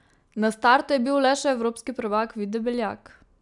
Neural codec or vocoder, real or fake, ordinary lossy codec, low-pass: none; real; none; 10.8 kHz